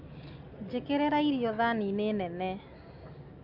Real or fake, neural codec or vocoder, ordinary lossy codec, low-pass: real; none; AAC, 48 kbps; 5.4 kHz